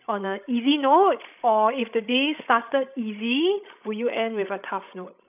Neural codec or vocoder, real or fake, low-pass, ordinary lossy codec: codec, 16 kHz, 16 kbps, FreqCodec, larger model; fake; 3.6 kHz; none